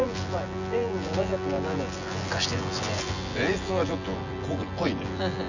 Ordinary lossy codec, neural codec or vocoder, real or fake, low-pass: none; vocoder, 24 kHz, 100 mel bands, Vocos; fake; 7.2 kHz